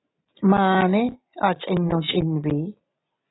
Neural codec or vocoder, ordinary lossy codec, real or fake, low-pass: none; AAC, 16 kbps; real; 7.2 kHz